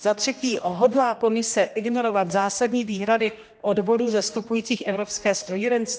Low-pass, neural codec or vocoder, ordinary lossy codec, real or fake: none; codec, 16 kHz, 1 kbps, X-Codec, HuBERT features, trained on general audio; none; fake